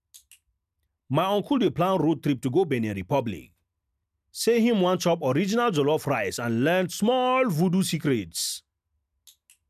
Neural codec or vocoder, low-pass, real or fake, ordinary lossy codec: none; 14.4 kHz; real; none